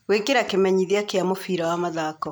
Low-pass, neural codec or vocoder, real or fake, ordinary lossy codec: none; none; real; none